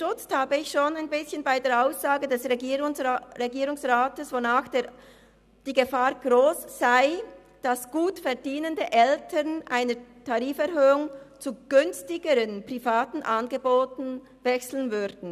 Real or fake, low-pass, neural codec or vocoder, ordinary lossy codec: real; 14.4 kHz; none; none